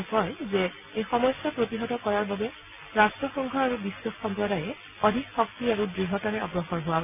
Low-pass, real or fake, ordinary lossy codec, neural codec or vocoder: 3.6 kHz; real; none; none